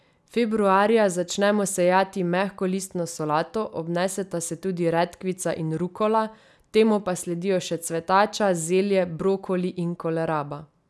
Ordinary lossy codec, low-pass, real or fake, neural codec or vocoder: none; none; real; none